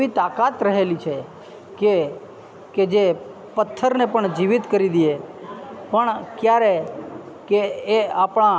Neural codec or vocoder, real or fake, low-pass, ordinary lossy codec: none; real; none; none